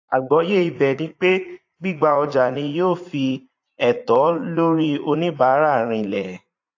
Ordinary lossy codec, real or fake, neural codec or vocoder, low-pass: AAC, 48 kbps; fake; vocoder, 44.1 kHz, 80 mel bands, Vocos; 7.2 kHz